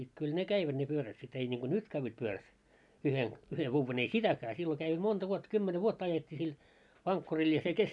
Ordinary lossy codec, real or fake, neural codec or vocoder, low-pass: none; real; none; 10.8 kHz